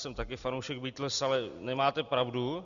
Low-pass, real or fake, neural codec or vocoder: 7.2 kHz; real; none